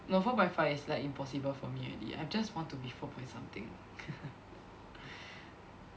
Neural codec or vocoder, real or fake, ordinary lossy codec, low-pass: none; real; none; none